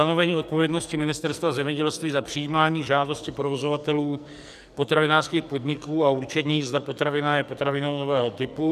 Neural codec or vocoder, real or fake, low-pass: codec, 44.1 kHz, 2.6 kbps, SNAC; fake; 14.4 kHz